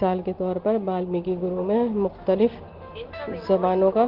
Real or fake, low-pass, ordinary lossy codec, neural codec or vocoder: real; 5.4 kHz; Opus, 16 kbps; none